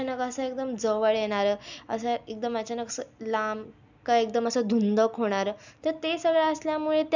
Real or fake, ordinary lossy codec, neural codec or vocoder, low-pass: real; none; none; 7.2 kHz